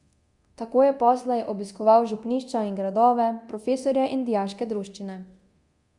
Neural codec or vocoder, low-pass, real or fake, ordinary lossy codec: codec, 24 kHz, 0.9 kbps, DualCodec; 10.8 kHz; fake; Opus, 64 kbps